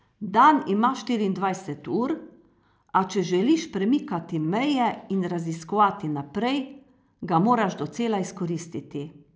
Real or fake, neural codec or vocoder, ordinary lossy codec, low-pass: real; none; none; none